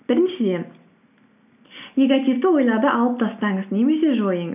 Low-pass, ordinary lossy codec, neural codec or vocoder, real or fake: 3.6 kHz; none; none; real